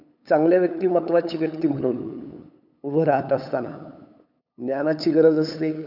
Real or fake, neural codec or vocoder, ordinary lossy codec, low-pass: fake; codec, 16 kHz, 8 kbps, FunCodec, trained on LibriTTS, 25 frames a second; none; 5.4 kHz